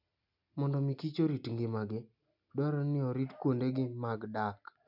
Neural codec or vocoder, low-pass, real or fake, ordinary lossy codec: none; 5.4 kHz; real; none